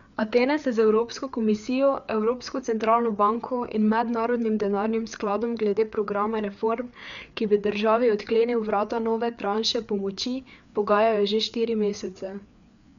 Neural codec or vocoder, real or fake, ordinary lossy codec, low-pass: codec, 16 kHz, 4 kbps, FreqCodec, larger model; fake; none; 7.2 kHz